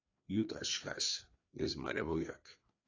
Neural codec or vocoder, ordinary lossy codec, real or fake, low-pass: codec, 16 kHz, 2 kbps, FreqCodec, larger model; AAC, 32 kbps; fake; 7.2 kHz